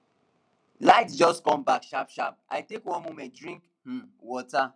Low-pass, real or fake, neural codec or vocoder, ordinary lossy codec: 9.9 kHz; real; none; none